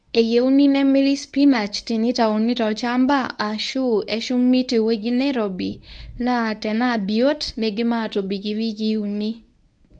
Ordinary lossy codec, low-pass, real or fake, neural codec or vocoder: none; 9.9 kHz; fake; codec, 24 kHz, 0.9 kbps, WavTokenizer, medium speech release version 1